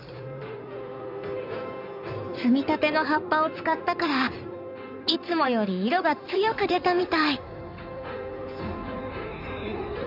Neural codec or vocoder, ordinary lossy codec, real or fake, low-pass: codec, 16 kHz in and 24 kHz out, 2.2 kbps, FireRedTTS-2 codec; none; fake; 5.4 kHz